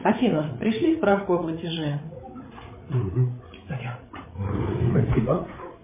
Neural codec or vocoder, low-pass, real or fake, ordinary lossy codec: codec, 16 kHz, 8 kbps, FreqCodec, larger model; 3.6 kHz; fake; MP3, 16 kbps